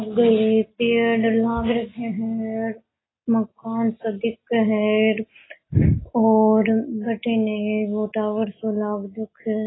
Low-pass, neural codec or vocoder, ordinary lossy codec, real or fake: 7.2 kHz; none; AAC, 16 kbps; real